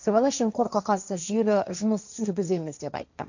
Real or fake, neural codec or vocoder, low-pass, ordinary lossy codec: fake; codec, 16 kHz, 1.1 kbps, Voila-Tokenizer; 7.2 kHz; none